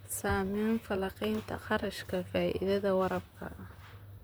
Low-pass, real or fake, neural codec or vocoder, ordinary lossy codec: none; fake; vocoder, 44.1 kHz, 128 mel bands, Pupu-Vocoder; none